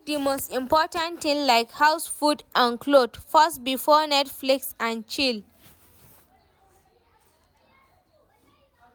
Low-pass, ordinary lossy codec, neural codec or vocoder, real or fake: none; none; none; real